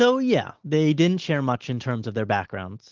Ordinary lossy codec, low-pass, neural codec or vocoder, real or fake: Opus, 16 kbps; 7.2 kHz; none; real